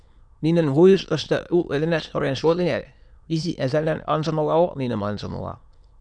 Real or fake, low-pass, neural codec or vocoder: fake; 9.9 kHz; autoencoder, 22.05 kHz, a latent of 192 numbers a frame, VITS, trained on many speakers